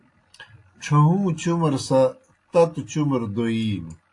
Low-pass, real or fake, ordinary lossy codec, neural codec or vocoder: 10.8 kHz; real; AAC, 48 kbps; none